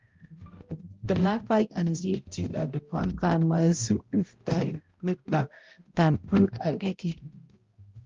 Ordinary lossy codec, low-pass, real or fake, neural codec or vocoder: Opus, 32 kbps; 7.2 kHz; fake; codec, 16 kHz, 0.5 kbps, X-Codec, HuBERT features, trained on balanced general audio